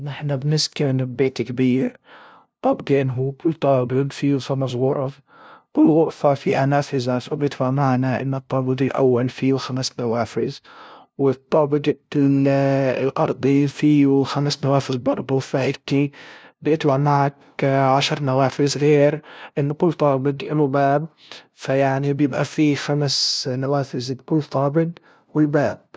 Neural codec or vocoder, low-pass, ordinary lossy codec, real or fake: codec, 16 kHz, 0.5 kbps, FunCodec, trained on LibriTTS, 25 frames a second; none; none; fake